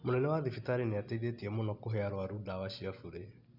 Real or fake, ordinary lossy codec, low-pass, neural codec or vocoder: real; none; 5.4 kHz; none